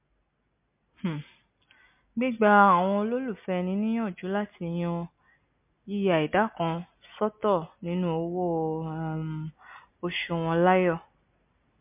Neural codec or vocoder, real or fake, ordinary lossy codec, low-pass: none; real; MP3, 32 kbps; 3.6 kHz